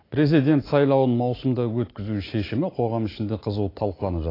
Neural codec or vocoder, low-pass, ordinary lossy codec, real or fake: none; 5.4 kHz; AAC, 24 kbps; real